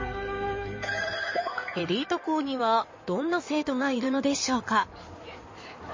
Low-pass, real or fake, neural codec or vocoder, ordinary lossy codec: 7.2 kHz; fake; codec, 16 kHz in and 24 kHz out, 2.2 kbps, FireRedTTS-2 codec; MP3, 32 kbps